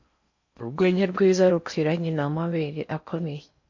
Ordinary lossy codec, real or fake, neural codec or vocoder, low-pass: MP3, 48 kbps; fake; codec, 16 kHz in and 24 kHz out, 0.6 kbps, FocalCodec, streaming, 4096 codes; 7.2 kHz